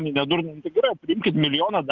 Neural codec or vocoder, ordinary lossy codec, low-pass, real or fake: none; Opus, 24 kbps; 7.2 kHz; real